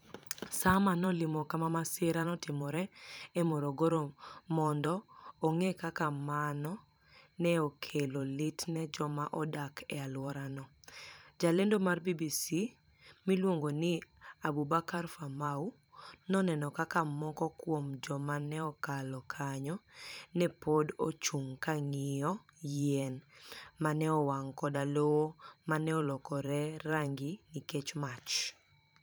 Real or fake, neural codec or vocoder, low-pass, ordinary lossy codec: fake; vocoder, 44.1 kHz, 128 mel bands every 512 samples, BigVGAN v2; none; none